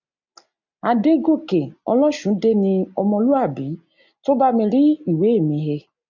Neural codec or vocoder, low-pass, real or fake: none; 7.2 kHz; real